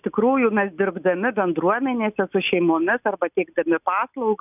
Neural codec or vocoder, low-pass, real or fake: none; 3.6 kHz; real